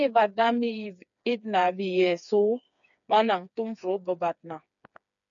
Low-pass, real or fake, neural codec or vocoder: 7.2 kHz; fake; codec, 16 kHz, 4 kbps, FreqCodec, smaller model